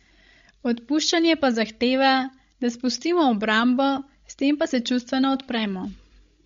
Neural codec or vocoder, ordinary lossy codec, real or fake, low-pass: codec, 16 kHz, 16 kbps, FreqCodec, larger model; MP3, 48 kbps; fake; 7.2 kHz